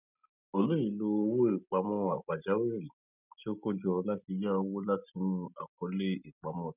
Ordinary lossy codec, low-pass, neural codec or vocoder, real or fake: none; 3.6 kHz; none; real